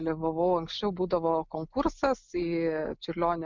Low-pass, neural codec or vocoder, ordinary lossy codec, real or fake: 7.2 kHz; none; MP3, 64 kbps; real